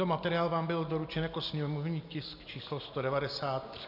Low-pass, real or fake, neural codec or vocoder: 5.4 kHz; real; none